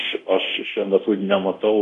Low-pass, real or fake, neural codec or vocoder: 10.8 kHz; fake; codec, 24 kHz, 0.9 kbps, DualCodec